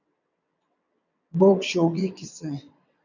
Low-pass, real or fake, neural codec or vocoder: 7.2 kHz; fake; vocoder, 22.05 kHz, 80 mel bands, WaveNeXt